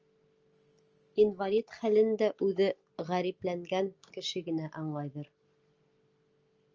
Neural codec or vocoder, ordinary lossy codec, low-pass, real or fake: none; Opus, 24 kbps; 7.2 kHz; real